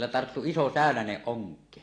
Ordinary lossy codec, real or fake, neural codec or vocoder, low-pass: AAC, 32 kbps; real; none; 9.9 kHz